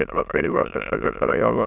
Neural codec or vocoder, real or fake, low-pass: autoencoder, 22.05 kHz, a latent of 192 numbers a frame, VITS, trained on many speakers; fake; 3.6 kHz